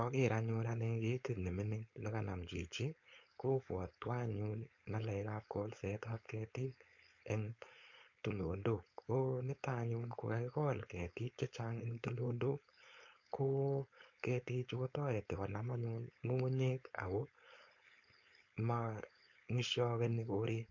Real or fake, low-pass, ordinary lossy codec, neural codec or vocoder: fake; 7.2 kHz; MP3, 48 kbps; codec, 16 kHz, 4.8 kbps, FACodec